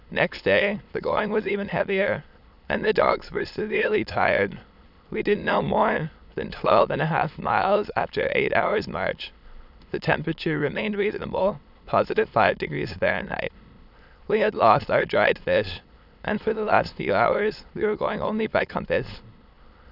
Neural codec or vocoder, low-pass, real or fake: autoencoder, 22.05 kHz, a latent of 192 numbers a frame, VITS, trained on many speakers; 5.4 kHz; fake